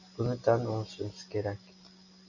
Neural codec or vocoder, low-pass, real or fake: none; 7.2 kHz; real